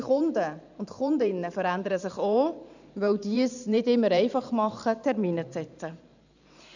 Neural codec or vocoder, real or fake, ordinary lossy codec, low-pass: vocoder, 44.1 kHz, 128 mel bands every 512 samples, BigVGAN v2; fake; none; 7.2 kHz